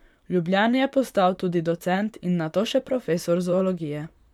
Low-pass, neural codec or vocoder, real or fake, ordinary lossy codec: 19.8 kHz; vocoder, 44.1 kHz, 128 mel bands, Pupu-Vocoder; fake; none